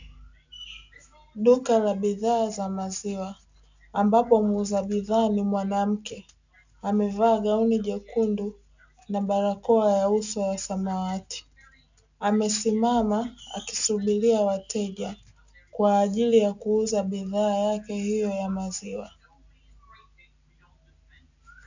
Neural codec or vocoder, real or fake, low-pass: none; real; 7.2 kHz